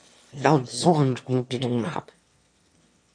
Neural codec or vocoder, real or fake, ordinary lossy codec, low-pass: autoencoder, 22.05 kHz, a latent of 192 numbers a frame, VITS, trained on one speaker; fake; MP3, 48 kbps; 9.9 kHz